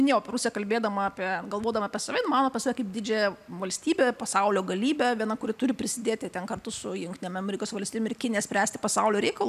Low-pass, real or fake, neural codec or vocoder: 14.4 kHz; real; none